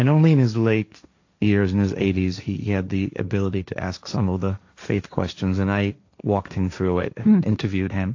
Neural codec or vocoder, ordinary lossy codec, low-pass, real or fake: codec, 16 kHz, 1.1 kbps, Voila-Tokenizer; AAC, 48 kbps; 7.2 kHz; fake